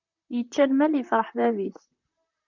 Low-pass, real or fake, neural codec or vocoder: 7.2 kHz; fake; vocoder, 44.1 kHz, 128 mel bands, Pupu-Vocoder